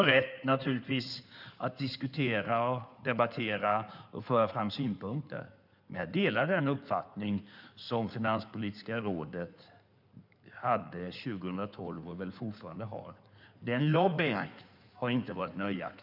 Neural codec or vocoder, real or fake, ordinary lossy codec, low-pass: codec, 16 kHz in and 24 kHz out, 2.2 kbps, FireRedTTS-2 codec; fake; none; 5.4 kHz